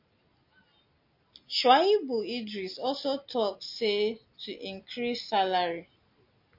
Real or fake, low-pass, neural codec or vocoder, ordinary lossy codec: real; 5.4 kHz; none; MP3, 32 kbps